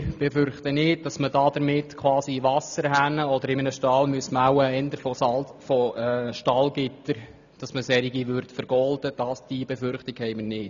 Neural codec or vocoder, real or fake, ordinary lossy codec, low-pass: none; real; none; 7.2 kHz